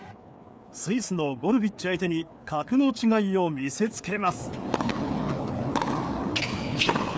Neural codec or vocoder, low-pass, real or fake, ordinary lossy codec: codec, 16 kHz, 4 kbps, FreqCodec, larger model; none; fake; none